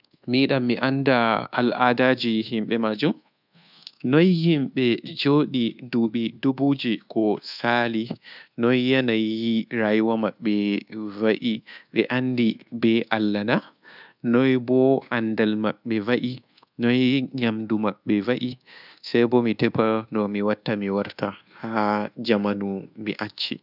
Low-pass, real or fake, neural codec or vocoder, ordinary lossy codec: 5.4 kHz; fake; codec, 24 kHz, 1.2 kbps, DualCodec; none